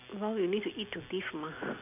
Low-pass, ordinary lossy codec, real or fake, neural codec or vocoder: 3.6 kHz; none; real; none